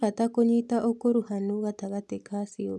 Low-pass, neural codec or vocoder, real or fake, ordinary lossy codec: none; none; real; none